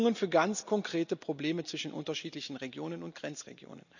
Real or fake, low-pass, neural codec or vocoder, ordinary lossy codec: real; 7.2 kHz; none; none